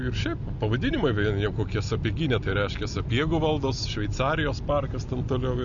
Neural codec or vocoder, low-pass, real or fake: none; 7.2 kHz; real